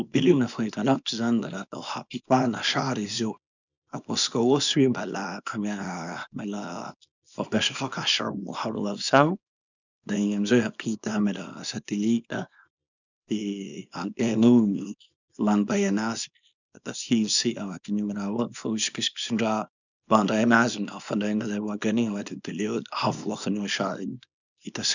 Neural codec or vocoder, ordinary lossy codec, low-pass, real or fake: codec, 24 kHz, 0.9 kbps, WavTokenizer, small release; none; 7.2 kHz; fake